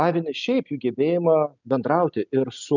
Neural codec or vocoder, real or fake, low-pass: none; real; 7.2 kHz